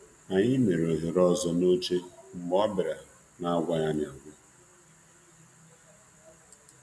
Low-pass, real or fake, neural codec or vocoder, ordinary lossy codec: none; real; none; none